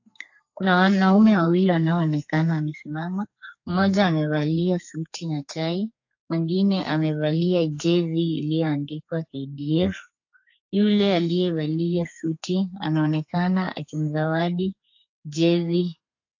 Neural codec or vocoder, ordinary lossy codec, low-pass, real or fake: codec, 32 kHz, 1.9 kbps, SNAC; AAC, 48 kbps; 7.2 kHz; fake